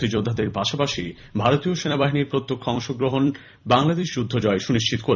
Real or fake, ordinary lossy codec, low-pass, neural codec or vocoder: fake; none; 7.2 kHz; vocoder, 44.1 kHz, 128 mel bands every 256 samples, BigVGAN v2